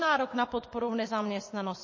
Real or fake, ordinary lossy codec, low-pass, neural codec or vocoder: fake; MP3, 32 kbps; 7.2 kHz; vocoder, 24 kHz, 100 mel bands, Vocos